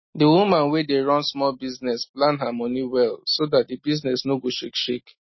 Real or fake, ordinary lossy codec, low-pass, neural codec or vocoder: real; MP3, 24 kbps; 7.2 kHz; none